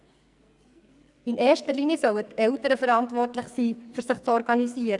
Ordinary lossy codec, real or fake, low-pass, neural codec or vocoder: none; fake; 10.8 kHz; codec, 44.1 kHz, 2.6 kbps, SNAC